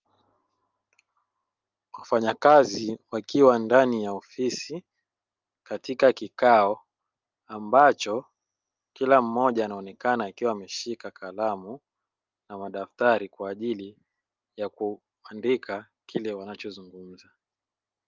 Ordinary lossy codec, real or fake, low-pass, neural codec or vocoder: Opus, 24 kbps; real; 7.2 kHz; none